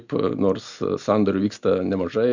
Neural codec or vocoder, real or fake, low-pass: none; real; 7.2 kHz